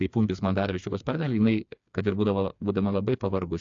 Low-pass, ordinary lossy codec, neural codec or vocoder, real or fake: 7.2 kHz; AAC, 64 kbps; codec, 16 kHz, 4 kbps, FreqCodec, smaller model; fake